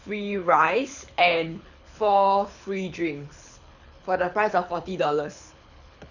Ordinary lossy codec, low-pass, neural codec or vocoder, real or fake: none; 7.2 kHz; codec, 24 kHz, 6 kbps, HILCodec; fake